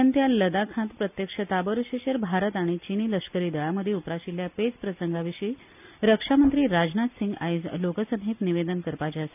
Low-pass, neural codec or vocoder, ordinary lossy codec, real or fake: 3.6 kHz; none; none; real